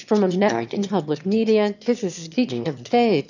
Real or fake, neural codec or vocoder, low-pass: fake; autoencoder, 22.05 kHz, a latent of 192 numbers a frame, VITS, trained on one speaker; 7.2 kHz